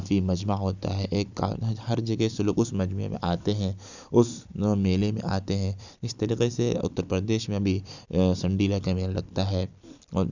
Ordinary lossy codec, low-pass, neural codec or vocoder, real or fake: none; 7.2 kHz; vocoder, 44.1 kHz, 128 mel bands every 256 samples, BigVGAN v2; fake